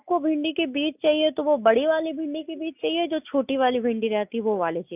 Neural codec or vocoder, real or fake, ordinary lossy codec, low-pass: none; real; none; 3.6 kHz